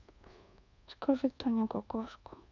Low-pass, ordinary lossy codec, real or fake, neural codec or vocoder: 7.2 kHz; none; fake; codec, 24 kHz, 1.2 kbps, DualCodec